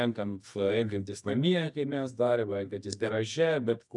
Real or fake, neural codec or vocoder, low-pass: fake; codec, 24 kHz, 0.9 kbps, WavTokenizer, medium music audio release; 10.8 kHz